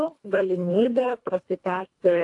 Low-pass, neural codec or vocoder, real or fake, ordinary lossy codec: 10.8 kHz; codec, 24 kHz, 1.5 kbps, HILCodec; fake; AAC, 48 kbps